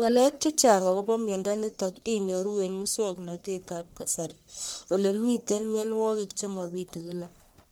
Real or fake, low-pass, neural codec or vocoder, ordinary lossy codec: fake; none; codec, 44.1 kHz, 1.7 kbps, Pupu-Codec; none